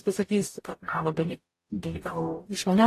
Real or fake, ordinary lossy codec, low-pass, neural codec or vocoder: fake; AAC, 48 kbps; 14.4 kHz; codec, 44.1 kHz, 0.9 kbps, DAC